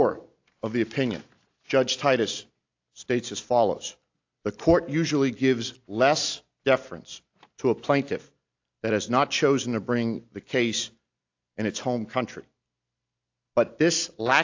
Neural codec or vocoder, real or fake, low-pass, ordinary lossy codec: none; real; 7.2 kHz; AAC, 48 kbps